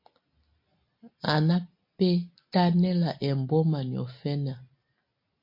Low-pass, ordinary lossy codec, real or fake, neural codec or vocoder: 5.4 kHz; MP3, 32 kbps; real; none